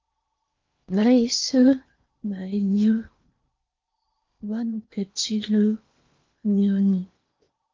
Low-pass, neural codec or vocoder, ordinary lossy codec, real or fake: 7.2 kHz; codec, 16 kHz in and 24 kHz out, 0.8 kbps, FocalCodec, streaming, 65536 codes; Opus, 24 kbps; fake